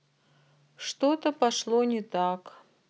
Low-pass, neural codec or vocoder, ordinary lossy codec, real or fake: none; none; none; real